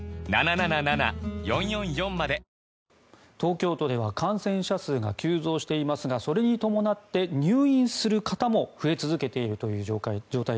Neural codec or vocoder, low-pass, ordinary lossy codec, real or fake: none; none; none; real